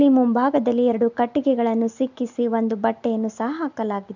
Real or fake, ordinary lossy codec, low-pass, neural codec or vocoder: real; none; 7.2 kHz; none